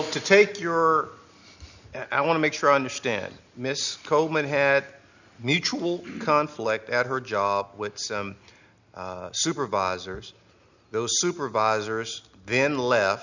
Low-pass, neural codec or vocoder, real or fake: 7.2 kHz; none; real